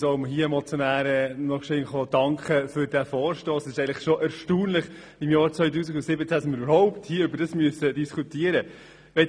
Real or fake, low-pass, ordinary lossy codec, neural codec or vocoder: real; 9.9 kHz; none; none